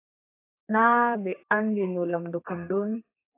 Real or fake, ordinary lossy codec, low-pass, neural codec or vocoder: fake; AAC, 24 kbps; 3.6 kHz; codec, 32 kHz, 1.9 kbps, SNAC